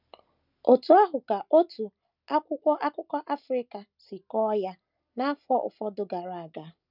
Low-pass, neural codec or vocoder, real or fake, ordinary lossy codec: 5.4 kHz; none; real; none